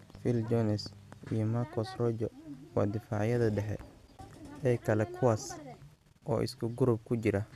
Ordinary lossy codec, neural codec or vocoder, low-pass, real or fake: none; none; 14.4 kHz; real